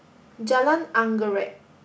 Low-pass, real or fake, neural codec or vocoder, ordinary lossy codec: none; real; none; none